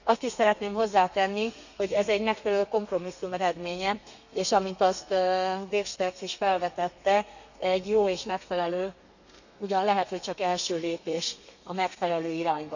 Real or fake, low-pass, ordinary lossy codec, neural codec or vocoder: fake; 7.2 kHz; none; codec, 32 kHz, 1.9 kbps, SNAC